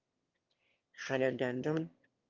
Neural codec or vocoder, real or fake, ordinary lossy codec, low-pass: autoencoder, 22.05 kHz, a latent of 192 numbers a frame, VITS, trained on one speaker; fake; Opus, 32 kbps; 7.2 kHz